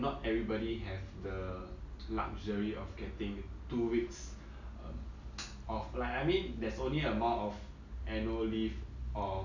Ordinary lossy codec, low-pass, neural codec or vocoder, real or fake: none; 7.2 kHz; none; real